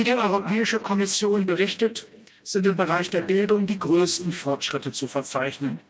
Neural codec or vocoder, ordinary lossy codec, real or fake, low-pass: codec, 16 kHz, 1 kbps, FreqCodec, smaller model; none; fake; none